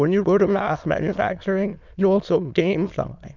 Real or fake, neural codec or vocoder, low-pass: fake; autoencoder, 22.05 kHz, a latent of 192 numbers a frame, VITS, trained on many speakers; 7.2 kHz